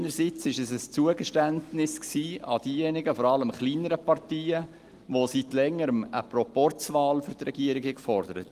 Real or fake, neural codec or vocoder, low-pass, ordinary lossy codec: real; none; 14.4 kHz; Opus, 24 kbps